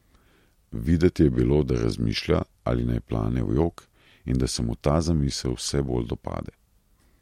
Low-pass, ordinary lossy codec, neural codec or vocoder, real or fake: 19.8 kHz; MP3, 64 kbps; none; real